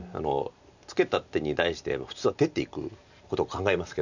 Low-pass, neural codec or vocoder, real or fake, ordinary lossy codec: 7.2 kHz; none; real; none